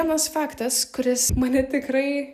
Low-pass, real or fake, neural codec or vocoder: 14.4 kHz; real; none